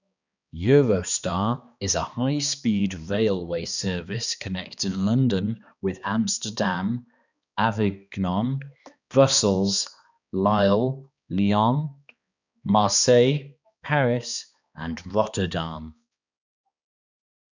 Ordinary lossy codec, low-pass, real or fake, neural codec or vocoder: none; 7.2 kHz; fake; codec, 16 kHz, 2 kbps, X-Codec, HuBERT features, trained on balanced general audio